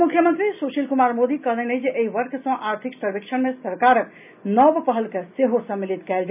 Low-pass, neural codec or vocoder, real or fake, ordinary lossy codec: 3.6 kHz; none; real; none